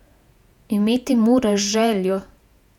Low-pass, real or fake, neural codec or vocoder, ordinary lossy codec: 19.8 kHz; fake; vocoder, 48 kHz, 128 mel bands, Vocos; none